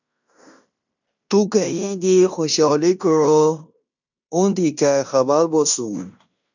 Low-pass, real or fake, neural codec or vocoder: 7.2 kHz; fake; codec, 16 kHz in and 24 kHz out, 0.9 kbps, LongCat-Audio-Codec, fine tuned four codebook decoder